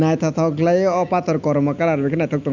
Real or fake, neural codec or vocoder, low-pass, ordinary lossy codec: real; none; 7.2 kHz; Opus, 64 kbps